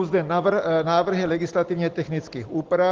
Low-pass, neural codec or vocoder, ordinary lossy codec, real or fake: 7.2 kHz; none; Opus, 16 kbps; real